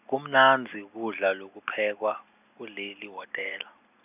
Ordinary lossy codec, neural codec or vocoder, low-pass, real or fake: none; none; 3.6 kHz; real